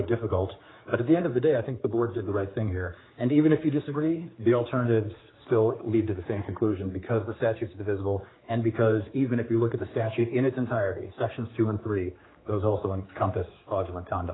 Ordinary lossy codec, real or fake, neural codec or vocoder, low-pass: AAC, 16 kbps; fake; codec, 16 kHz, 4 kbps, X-Codec, HuBERT features, trained on general audio; 7.2 kHz